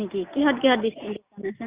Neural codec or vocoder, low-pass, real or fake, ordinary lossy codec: none; 3.6 kHz; real; Opus, 64 kbps